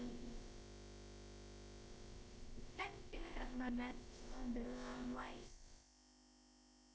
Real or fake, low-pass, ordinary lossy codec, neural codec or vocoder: fake; none; none; codec, 16 kHz, about 1 kbps, DyCAST, with the encoder's durations